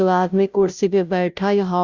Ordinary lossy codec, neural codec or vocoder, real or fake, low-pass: Opus, 64 kbps; codec, 16 kHz, 0.5 kbps, FunCodec, trained on Chinese and English, 25 frames a second; fake; 7.2 kHz